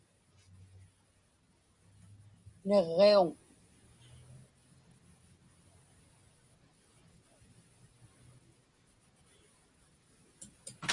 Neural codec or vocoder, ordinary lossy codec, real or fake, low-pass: none; Opus, 64 kbps; real; 10.8 kHz